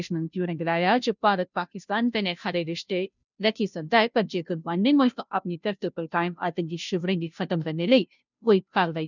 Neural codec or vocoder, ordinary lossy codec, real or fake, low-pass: codec, 16 kHz, 0.5 kbps, FunCodec, trained on Chinese and English, 25 frames a second; none; fake; 7.2 kHz